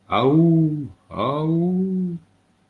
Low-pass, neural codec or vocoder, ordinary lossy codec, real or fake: 10.8 kHz; none; Opus, 24 kbps; real